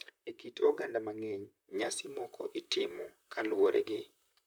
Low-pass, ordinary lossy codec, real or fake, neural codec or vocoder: none; none; fake; vocoder, 44.1 kHz, 128 mel bands, Pupu-Vocoder